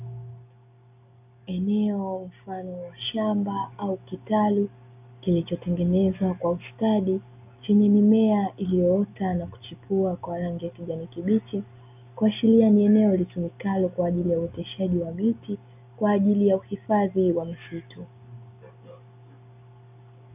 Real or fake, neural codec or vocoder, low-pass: real; none; 3.6 kHz